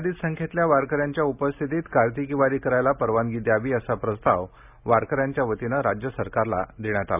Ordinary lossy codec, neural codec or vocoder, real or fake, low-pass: none; none; real; 3.6 kHz